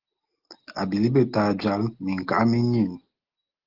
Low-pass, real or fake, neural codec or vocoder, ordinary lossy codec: 5.4 kHz; real; none; Opus, 16 kbps